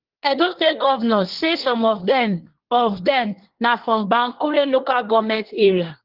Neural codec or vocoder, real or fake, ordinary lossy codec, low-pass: codec, 24 kHz, 1 kbps, SNAC; fake; Opus, 16 kbps; 5.4 kHz